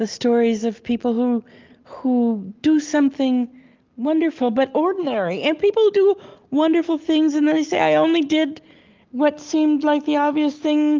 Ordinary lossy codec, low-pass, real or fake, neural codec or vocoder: Opus, 32 kbps; 7.2 kHz; real; none